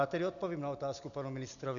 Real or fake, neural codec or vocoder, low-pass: real; none; 7.2 kHz